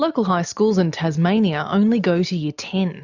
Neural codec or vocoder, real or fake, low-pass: none; real; 7.2 kHz